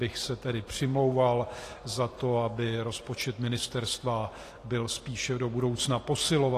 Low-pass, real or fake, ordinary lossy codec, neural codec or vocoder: 14.4 kHz; fake; AAC, 48 kbps; vocoder, 44.1 kHz, 128 mel bands every 256 samples, BigVGAN v2